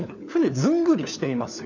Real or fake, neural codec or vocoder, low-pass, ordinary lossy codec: fake; codec, 16 kHz, 4 kbps, FunCodec, trained on LibriTTS, 50 frames a second; 7.2 kHz; none